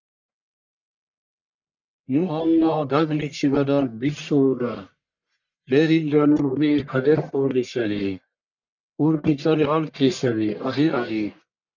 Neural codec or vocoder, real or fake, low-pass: codec, 44.1 kHz, 1.7 kbps, Pupu-Codec; fake; 7.2 kHz